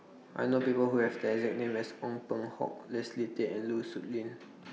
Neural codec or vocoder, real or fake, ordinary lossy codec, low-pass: none; real; none; none